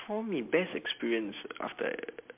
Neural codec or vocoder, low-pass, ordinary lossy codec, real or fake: none; 3.6 kHz; MP3, 32 kbps; real